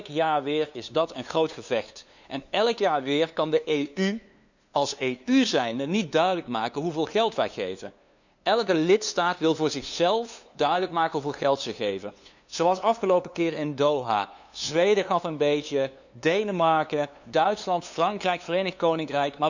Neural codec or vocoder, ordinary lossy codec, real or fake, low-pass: codec, 16 kHz, 2 kbps, FunCodec, trained on LibriTTS, 25 frames a second; none; fake; 7.2 kHz